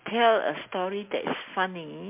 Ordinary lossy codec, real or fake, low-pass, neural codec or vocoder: MP3, 32 kbps; real; 3.6 kHz; none